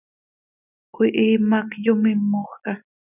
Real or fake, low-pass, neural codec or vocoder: real; 3.6 kHz; none